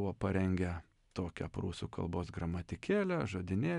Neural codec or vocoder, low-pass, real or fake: none; 10.8 kHz; real